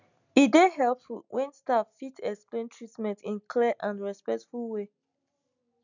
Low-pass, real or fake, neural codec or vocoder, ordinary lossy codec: 7.2 kHz; real; none; none